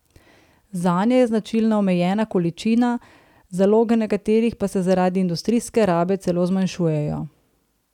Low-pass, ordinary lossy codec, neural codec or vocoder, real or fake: 19.8 kHz; none; none; real